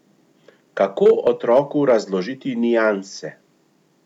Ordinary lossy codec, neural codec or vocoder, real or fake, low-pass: none; none; real; 19.8 kHz